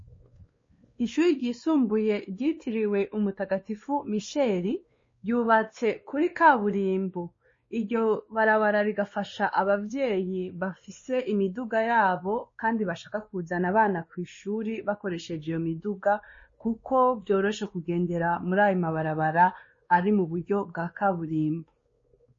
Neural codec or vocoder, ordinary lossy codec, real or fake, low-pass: codec, 16 kHz, 2 kbps, X-Codec, WavLM features, trained on Multilingual LibriSpeech; MP3, 32 kbps; fake; 7.2 kHz